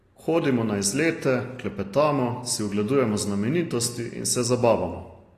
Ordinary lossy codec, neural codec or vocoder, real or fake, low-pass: AAC, 48 kbps; none; real; 14.4 kHz